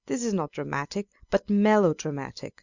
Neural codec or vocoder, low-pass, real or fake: none; 7.2 kHz; real